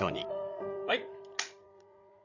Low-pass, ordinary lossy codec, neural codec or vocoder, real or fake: 7.2 kHz; none; codec, 16 kHz, 16 kbps, FreqCodec, larger model; fake